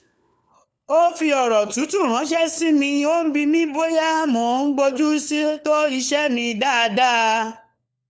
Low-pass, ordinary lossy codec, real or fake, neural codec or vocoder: none; none; fake; codec, 16 kHz, 4 kbps, FunCodec, trained on LibriTTS, 50 frames a second